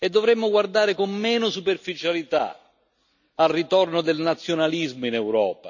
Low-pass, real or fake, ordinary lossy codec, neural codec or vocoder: 7.2 kHz; real; none; none